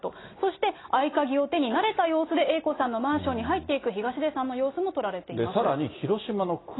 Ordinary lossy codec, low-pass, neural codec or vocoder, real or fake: AAC, 16 kbps; 7.2 kHz; none; real